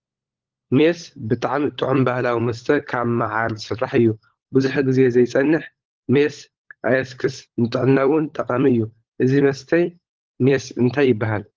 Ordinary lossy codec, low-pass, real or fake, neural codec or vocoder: Opus, 16 kbps; 7.2 kHz; fake; codec, 16 kHz, 16 kbps, FunCodec, trained on LibriTTS, 50 frames a second